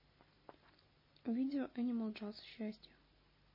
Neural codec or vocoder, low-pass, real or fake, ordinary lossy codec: none; 5.4 kHz; real; MP3, 24 kbps